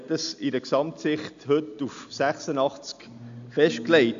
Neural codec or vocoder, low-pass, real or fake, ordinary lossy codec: none; 7.2 kHz; real; AAC, 64 kbps